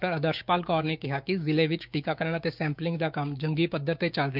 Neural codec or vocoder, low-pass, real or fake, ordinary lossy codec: codec, 16 kHz, 6 kbps, DAC; 5.4 kHz; fake; none